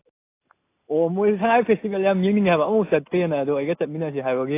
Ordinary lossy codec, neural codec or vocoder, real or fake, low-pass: AAC, 24 kbps; none; real; 3.6 kHz